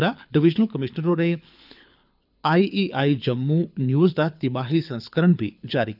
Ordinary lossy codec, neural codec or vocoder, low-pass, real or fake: none; codec, 24 kHz, 6 kbps, HILCodec; 5.4 kHz; fake